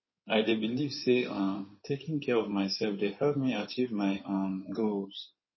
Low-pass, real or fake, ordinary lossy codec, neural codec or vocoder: 7.2 kHz; fake; MP3, 24 kbps; codec, 16 kHz in and 24 kHz out, 2.2 kbps, FireRedTTS-2 codec